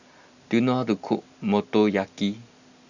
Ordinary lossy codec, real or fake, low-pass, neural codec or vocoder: none; real; 7.2 kHz; none